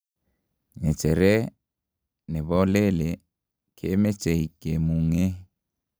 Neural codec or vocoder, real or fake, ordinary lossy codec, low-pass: none; real; none; none